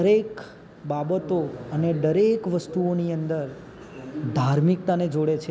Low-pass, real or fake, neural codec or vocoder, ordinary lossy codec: none; real; none; none